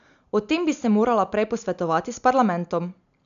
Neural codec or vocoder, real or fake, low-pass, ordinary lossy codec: none; real; 7.2 kHz; none